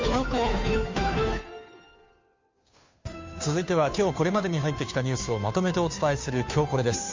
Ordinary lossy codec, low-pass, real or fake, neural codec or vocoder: MP3, 48 kbps; 7.2 kHz; fake; codec, 16 kHz, 2 kbps, FunCodec, trained on Chinese and English, 25 frames a second